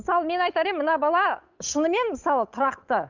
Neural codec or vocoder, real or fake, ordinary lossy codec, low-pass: codec, 16 kHz, 8 kbps, FunCodec, trained on Chinese and English, 25 frames a second; fake; none; 7.2 kHz